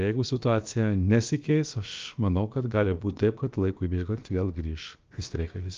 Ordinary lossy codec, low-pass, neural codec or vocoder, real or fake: Opus, 24 kbps; 7.2 kHz; codec, 16 kHz, about 1 kbps, DyCAST, with the encoder's durations; fake